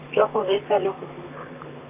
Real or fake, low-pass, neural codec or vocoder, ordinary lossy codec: fake; 3.6 kHz; vocoder, 44.1 kHz, 128 mel bands, Pupu-Vocoder; none